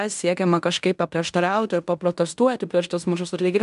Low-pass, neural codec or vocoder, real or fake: 10.8 kHz; codec, 16 kHz in and 24 kHz out, 0.9 kbps, LongCat-Audio-Codec, fine tuned four codebook decoder; fake